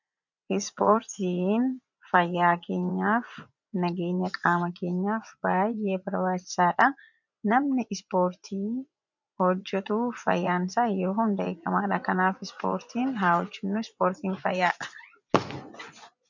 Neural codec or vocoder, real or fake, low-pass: vocoder, 24 kHz, 100 mel bands, Vocos; fake; 7.2 kHz